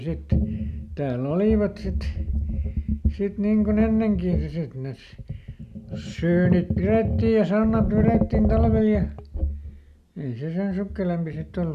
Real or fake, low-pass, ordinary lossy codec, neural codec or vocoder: real; 14.4 kHz; none; none